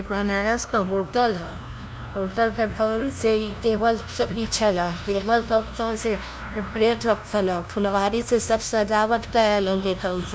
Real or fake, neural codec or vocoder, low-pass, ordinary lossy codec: fake; codec, 16 kHz, 0.5 kbps, FunCodec, trained on LibriTTS, 25 frames a second; none; none